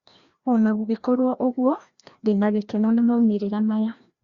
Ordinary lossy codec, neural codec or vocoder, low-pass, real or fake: Opus, 64 kbps; codec, 16 kHz, 1 kbps, FreqCodec, larger model; 7.2 kHz; fake